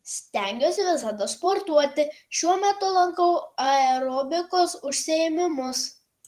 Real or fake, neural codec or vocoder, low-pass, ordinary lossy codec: fake; vocoder, 44.1 kHz, 128 mel bands every 512 samples, BigVGAN v2; 14.4 kHz; Opus, 24 kbps